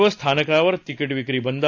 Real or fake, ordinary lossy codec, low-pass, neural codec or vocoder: real; AAC, 48 kbps; 7.2 kHz; none